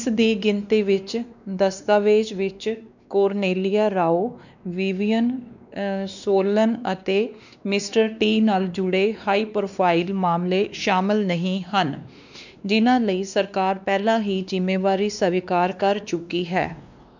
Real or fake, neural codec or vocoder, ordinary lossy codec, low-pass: fake; codec, 16 kHz, 2 kbps, X-Codec, WavLM features, trained on Multilingual LibriSpeech; none; 7.2 kHz